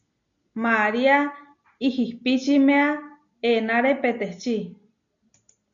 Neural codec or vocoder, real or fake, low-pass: none; real; 7.2 kHz